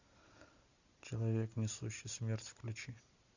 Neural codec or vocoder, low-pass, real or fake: none; 7.2 kHz; real